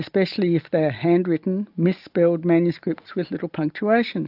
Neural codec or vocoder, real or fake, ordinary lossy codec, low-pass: none; real; AAC, 48 kbps; 5.4 kHz